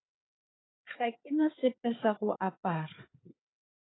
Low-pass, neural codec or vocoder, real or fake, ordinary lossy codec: 7.2 kHz; codec, 16 kHz, 4 kbps, FunCodec, trained on Chinese and English, 50 frames a second; fake; AAC, 16 kbps